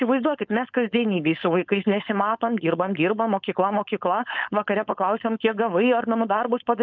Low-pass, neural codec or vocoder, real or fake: 7.2 kHz; codec, 16 kHz, 4.8 kbps, FACodec; fake